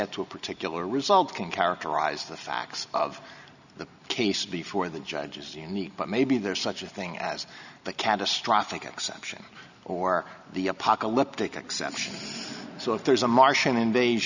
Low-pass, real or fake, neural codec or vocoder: 7.2 kHz; real; none